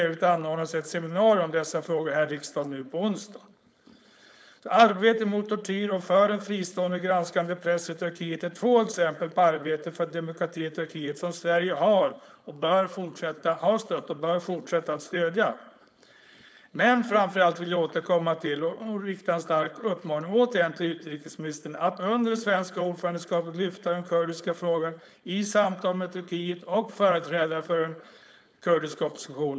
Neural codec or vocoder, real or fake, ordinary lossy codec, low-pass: codec, 16 kHz, 4.8 kbps, FACodec; fake; none; none